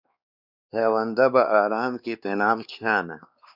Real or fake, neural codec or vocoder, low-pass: fake; codec, 16 kHz, 2 kbps, X-Codec, WavLM features, trained on Multilingual LibriSpeech; 5.4 kHz